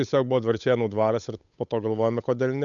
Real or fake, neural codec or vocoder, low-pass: fake; codec, 16 kHz, 8 kbps, FunCodec, trained on Chinese and English, 25 frames a second; 7.2 kHz